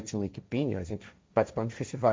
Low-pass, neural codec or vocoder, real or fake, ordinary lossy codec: none; codec, 16 kHz, 1.1 kbps, Voila-Tokenizer; fake; none